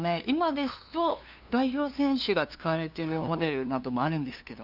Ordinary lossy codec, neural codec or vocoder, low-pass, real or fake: Opus, 64 kbps; codec, 16 kHz, 2 kbps, FunCodec, trained on LibriTTS, 25 frames a second; 5.4 kHz; fake